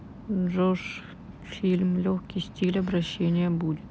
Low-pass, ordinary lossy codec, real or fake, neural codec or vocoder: none; none; real; none